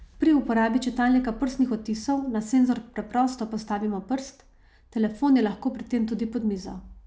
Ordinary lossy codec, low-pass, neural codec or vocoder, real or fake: none; none; none; real